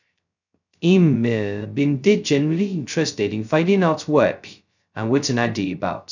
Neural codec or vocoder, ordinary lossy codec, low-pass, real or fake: codec, 16 kHz, 0.2 kbps, FocalCodec; none; 7.2 kHz; fake